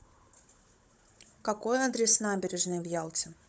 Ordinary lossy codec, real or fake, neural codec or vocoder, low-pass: none; fake; codec, 16 kHz, 16 kbps, FunCodec, trained on Chinese and English, 50 frames a second; none